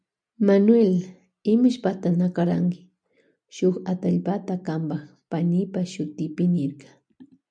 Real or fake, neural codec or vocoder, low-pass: real; none; 9.9 kHz